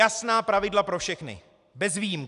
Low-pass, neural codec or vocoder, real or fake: 10.8 kHz; none; real